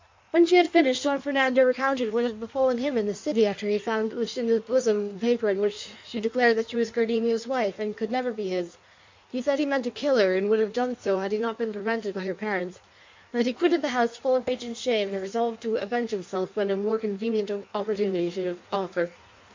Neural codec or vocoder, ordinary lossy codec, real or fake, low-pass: codec, 16 kHz in and 24 kHz out, 1.1 kbps, FireRedTTS-2 codec; MP3, 64 kbps; fake; 7.2 kHz